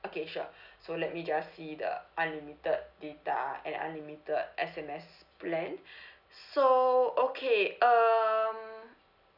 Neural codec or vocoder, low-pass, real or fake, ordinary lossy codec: none; 5.4 kHz; real; none